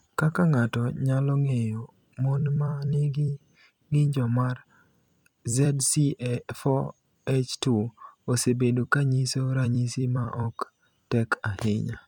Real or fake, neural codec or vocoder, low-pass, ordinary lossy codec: fake; vocoder, 44.1 kHz, 128 mel bands every 256 samples, BigVGAN v2; 19.8 kHz; none